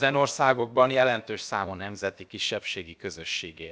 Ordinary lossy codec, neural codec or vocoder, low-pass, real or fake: none; codec, 16 kHz, about 1 kbps, DyCAST, with the encoder's durations; none; fake